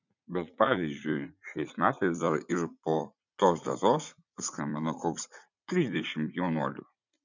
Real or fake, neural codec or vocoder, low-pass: fake; vocoder, 44.1 kHz, 80 mel bands, Vocos; 7.2 kHz